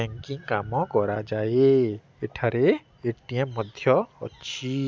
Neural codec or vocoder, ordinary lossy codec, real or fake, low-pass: none; none; real; none